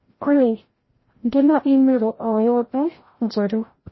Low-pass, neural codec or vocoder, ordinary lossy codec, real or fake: 7.2 kHz; codec, 16 kHz, 0.5 kbps, FreqCodec, larger model; MP3, 24 kbps; fake